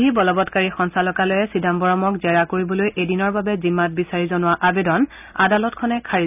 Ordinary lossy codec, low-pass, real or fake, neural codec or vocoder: none; 3.6 kHz; real; none